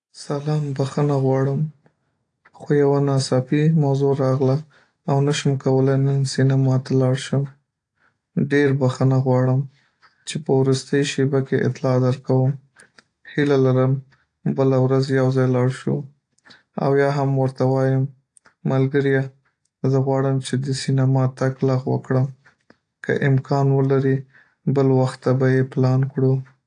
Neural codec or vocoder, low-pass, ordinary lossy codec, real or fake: none; 9.9 kHz; AAC, 48 kbps; real